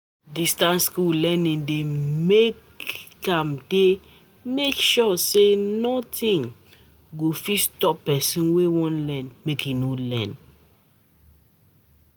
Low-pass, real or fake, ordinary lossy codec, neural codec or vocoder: none; real; none; none